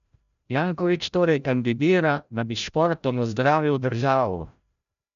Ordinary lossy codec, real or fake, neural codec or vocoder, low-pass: MP3, 96 kbps; fake; codec, 16 kHz, 0.5 kbps, FreqCodec, larger model; 7.2 kHz